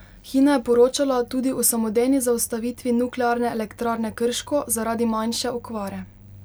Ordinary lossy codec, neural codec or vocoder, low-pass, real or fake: none; none; none; real